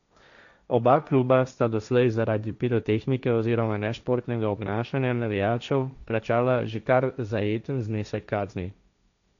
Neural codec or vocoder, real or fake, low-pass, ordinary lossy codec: codec, 16 kHz, 1.1 kbps, Voila-Tokenizer; fake; 7.2 kHz; none